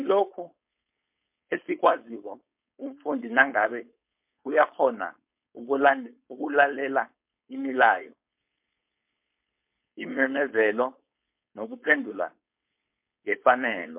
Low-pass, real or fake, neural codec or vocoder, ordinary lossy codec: 3.6 kHz; fake; codec, 16 kHz, 4.8 kbps, FACodec; MP3, 32 kbps